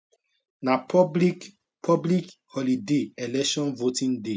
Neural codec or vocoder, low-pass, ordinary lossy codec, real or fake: none; none; none; real